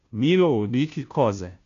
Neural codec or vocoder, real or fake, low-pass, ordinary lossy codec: codec, 16 kHz, 0.5 kbps, FunCodec, trained on Chinese and English, 25 frames a second; fake; 7.2 kHz; AAC, 64 kbps